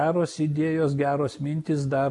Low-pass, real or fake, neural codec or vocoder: 10.8 kHz; real; none